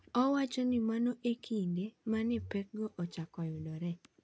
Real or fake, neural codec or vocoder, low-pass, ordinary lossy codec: real; none; none; none